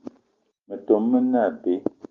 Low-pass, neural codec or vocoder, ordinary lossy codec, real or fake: 7.2 kHz; none; Opus, 32 kbps; real